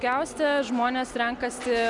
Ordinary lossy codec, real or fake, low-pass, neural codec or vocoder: MP3, 96 kbps; real; 10.8 kHz; none